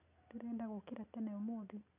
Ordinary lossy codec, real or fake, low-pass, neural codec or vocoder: none; real; 3.6 kHz; none